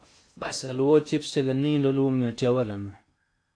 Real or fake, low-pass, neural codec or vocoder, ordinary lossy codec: fake; 9.9 kHz; codec, 16 kHz in and 24 kHz out, 0.6 kbps, FocalCodec, streaming, 2048 codes; AAC, 48 kbps